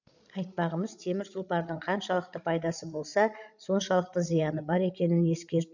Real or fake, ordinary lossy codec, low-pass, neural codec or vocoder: fake; none; 7.2 kHz; codec, 16 kHz, 16 kbps, FreqCodec, larger model